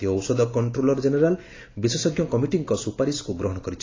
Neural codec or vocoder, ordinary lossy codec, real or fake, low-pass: none; AAC, 32 kbps; real; 7.2 kHz